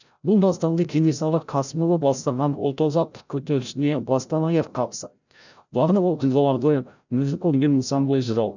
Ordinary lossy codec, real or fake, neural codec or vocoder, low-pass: none; fake; codec, 16 kHz, 0.5 kbps, FreqCodec, larger model; 7.2 kHz